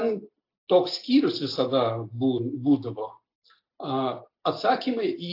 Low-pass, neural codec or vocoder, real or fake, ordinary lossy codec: 5.4 kHz; none; real; AAC, 32 kbps